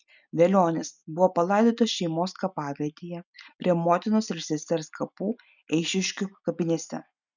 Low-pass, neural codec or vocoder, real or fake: 7.2 kHz; none; real